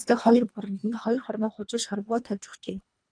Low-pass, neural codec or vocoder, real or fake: 9.9 kHz; codec, 24 kHz, 1.5 kbps, HILCodec; fake